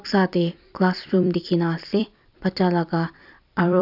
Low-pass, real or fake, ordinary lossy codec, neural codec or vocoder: 5.4 kHz; fake; none; vocoder, 44.1 kHz, 128 mel bands every 256 samples, BigVGAN v2